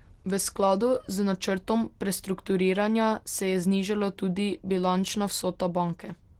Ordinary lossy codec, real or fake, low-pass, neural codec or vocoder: Opus, 16 kbps; real; 19.8 kHz; none